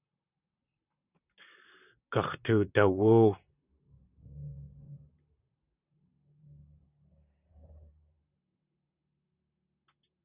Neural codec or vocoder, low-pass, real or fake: vocoder, 44.1 kHz, 128 mel bands, Pupu-Vocoder; 3.6 kHz; fake